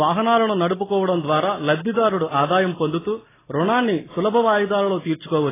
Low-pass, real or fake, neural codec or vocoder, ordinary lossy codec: 3.6 kHz; real; none; AAC, 16 kbps